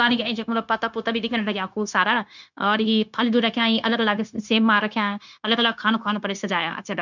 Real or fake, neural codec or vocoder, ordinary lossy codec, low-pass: fake; codec, 16 kHz, 0.9 kbps, LongCat-Audio-Codec; none; 7.2 kHz